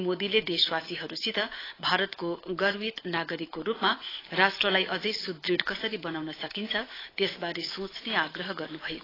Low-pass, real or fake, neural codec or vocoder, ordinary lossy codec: 5.4 kHz; fake; autoencoder, 48 kHz, 128 numbers a frame, DAC-VAE, trained on Japanese speech; AAC, 24 kbps